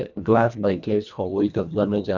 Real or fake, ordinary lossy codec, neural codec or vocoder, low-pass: fake; none; codec, 24 kHz, 1.5 kbps, HILCodec; 7.2 kHz